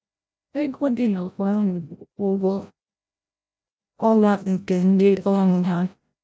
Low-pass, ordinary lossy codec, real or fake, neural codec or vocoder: none; none; fake; codec, 16 kHz, 0.5 kbps, FreqCodec, larger model